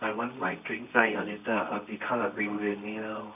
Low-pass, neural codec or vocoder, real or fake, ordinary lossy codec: 3.6 kHz; codec, 24 kHz, 0.9 kbps, WavTokenizer, medium music audio release; fake; none